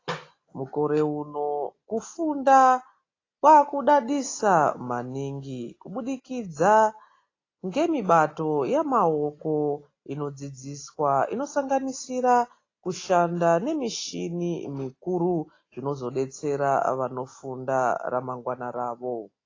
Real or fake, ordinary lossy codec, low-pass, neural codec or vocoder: real; AAC, 32 kbps; 7.2 kHz; none